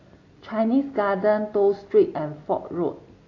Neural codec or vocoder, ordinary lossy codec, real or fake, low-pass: none; AAC, 32 kbps; real; 7.2 kHz